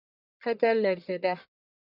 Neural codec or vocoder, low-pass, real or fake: codec, 44.1 kHz, 1.7 kbps, Pupu-Codec; 5.4 kHz; fake